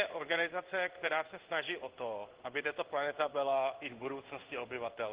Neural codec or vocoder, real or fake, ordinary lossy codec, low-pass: codec, 16 kHz in and 24 kHz out, 1 kbps, XY-Tokenizer; fake; Opus, 16 kbps; 3.6 kHz